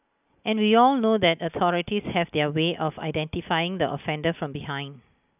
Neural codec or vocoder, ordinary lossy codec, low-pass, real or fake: none; none; 3.6 kHz; real